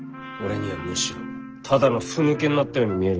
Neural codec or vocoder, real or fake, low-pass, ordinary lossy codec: none; real; 7.2 kHz; Opus, 16 kbps